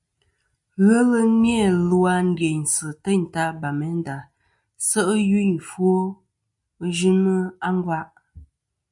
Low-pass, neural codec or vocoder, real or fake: 10.8 kHz; none; real